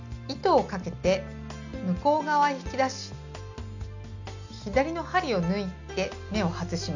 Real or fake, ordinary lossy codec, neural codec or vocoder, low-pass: real; none; none; 7.2 kHz